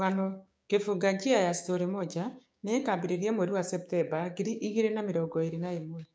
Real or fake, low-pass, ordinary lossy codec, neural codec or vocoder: fake; none; none; codec, 16 kHz, 6 kbps, DAC